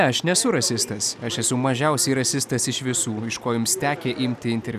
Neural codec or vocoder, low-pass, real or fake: none; 14.4 kHz; real